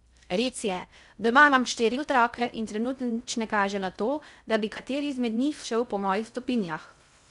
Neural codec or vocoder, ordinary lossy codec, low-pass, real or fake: codec, 16 kHz in and 24 kHz out, 0.6 kbps, FocalCodec, streaming, 2048 codes; none; 10.8 kHz; fake